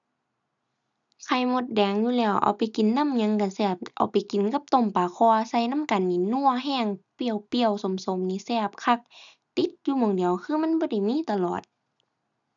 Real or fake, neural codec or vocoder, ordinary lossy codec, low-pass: real; none; none; 7.2 kHz